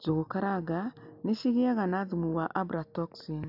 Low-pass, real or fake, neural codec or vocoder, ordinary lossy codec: 5.4 kHz; real; none; none